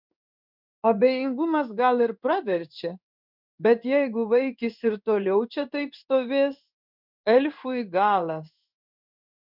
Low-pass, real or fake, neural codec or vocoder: 5.4 kHz; fake; codec, 16 kHz in and 24 kHz out, 1 kbps, XY-Tokenizer